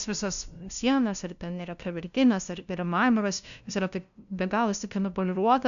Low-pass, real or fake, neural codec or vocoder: 7.2 kHz; fake; codec, 16 kHz, 0.5 kbps, FunCodec, trained on LibriTTS, 25 frames a second